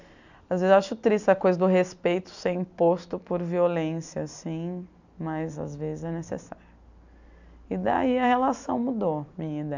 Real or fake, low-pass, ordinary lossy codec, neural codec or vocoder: real; 7.2 kHz; none; none